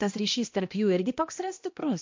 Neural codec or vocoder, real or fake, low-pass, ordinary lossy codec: codec, 16 kHz, 1 kbps, X-Codec, HuBERT features, trained on balanced general audio; fake; 7.2 kHz; MP3, 48 kbps